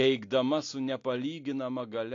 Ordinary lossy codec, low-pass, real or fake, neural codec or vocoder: AAC, 48 kbps; 7.2 kHz; real; none